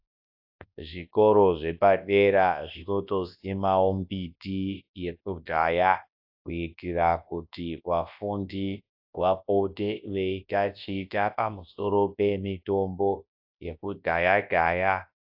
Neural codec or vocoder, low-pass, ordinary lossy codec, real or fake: codec, 24 kHz, 0.9 kbps, WavTokenizer, large speech release; 5.4 kHz; AAC, 48 kbps; fake